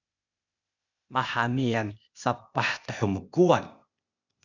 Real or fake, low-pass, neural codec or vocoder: fake; 7.2 kHz; codec, 16 kHz, 0.8 kbps, ZipCodec